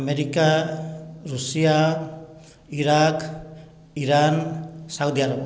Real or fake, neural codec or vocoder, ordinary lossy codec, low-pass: real; none; none; none